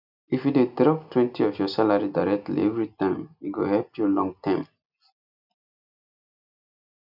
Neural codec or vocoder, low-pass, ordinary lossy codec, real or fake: none; 5.4 kHz; none; real